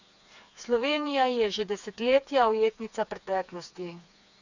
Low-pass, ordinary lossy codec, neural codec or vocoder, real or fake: 7.2 kHz; none; codec, 16 kHz, 4 kbps, FreqCodec, smaller model; fake